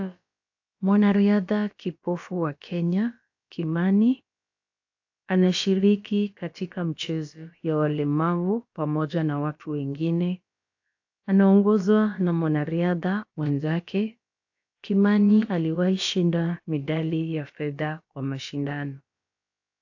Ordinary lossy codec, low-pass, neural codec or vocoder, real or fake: AAC, 48 kbps; 7.2 kHz; codec, 16 kHz, about 1 kbps, DyCAST, with the encoder's durations; fake